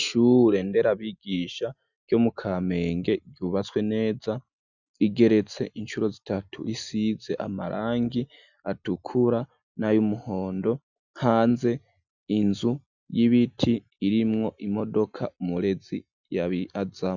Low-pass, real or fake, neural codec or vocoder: 7.2 kHz; real; none